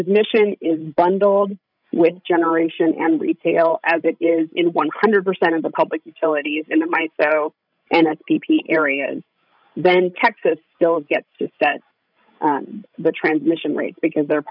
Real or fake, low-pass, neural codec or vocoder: fake; 5.4 kHz; codec, 16 kHz, 16 kbps, FreqCodec, larger model